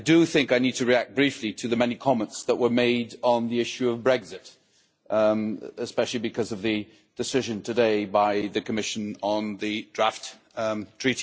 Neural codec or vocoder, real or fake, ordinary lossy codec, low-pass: none; real; none; none